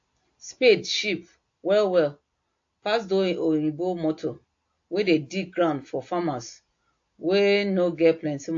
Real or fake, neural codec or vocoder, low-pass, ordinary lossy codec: real; none; 7.2 kHz; AAC, 48 kbps